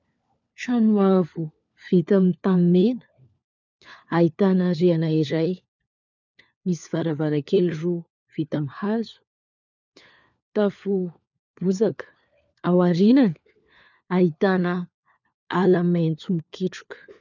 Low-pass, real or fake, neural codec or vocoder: 7.2 kHz; fake; codec, 16 kHz, 4 kbps, FunCodec, trained on LibriTTS, 50 frames a second